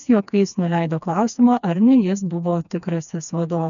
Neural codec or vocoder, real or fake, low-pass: codec, 16 kHz, 2 kbps, FreqCodec, smaller model; fake; 7.2 kHz